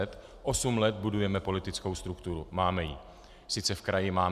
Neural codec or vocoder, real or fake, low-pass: none; real; 14.4 kHz